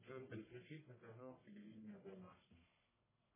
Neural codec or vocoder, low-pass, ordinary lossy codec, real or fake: codec, 44.1 kHz, 1.7 kbps, Pupu-Codec; 3.6 kHz; MP3, 16 kbps; fake